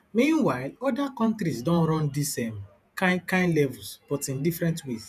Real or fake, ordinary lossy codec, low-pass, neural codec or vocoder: fake; none; 14.4 kHz; vocoder, 48 kHz, 128 mel bands, Vocos